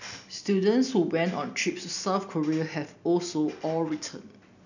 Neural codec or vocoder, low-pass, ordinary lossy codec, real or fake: none; 7.2 kHz; none; real